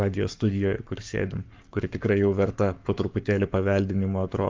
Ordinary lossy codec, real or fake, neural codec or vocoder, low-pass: Opus, 24 kbps; fake; codec, 44.1 kHz, 7.8 kbps, Pupu-Codec; 7.2 kHz